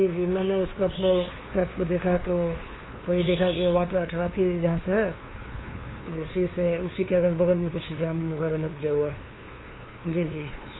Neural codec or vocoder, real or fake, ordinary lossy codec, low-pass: codec, 16 kHz, 2 kbps, FunCodec, trained on LibriTTS, 25 frames a second; fake; AAC, 16 kbps; 7.2 kHz